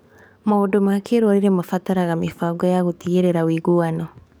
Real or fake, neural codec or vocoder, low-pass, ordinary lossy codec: fake; codec, 44.1 kHz, 7.8 kbps, DAC; none; none